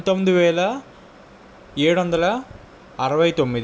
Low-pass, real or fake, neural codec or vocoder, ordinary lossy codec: none; real; none; none